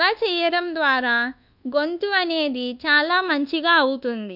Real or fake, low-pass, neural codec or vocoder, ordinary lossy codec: fake; 5.4 kHz; codec, 24 kHz, 1.2 kbps, DualCodec; none